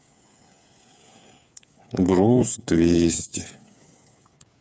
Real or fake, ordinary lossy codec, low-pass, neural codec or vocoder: fake; none; none; codec, 16 kHz, 8 kbps, FreqCodec, smaller model